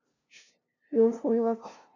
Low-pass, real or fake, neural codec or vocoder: 7.2 kHz; fake; codec, 16 kHz, 0.5 kbps, FunCodec, trained on LibriTTS, 25 frames a second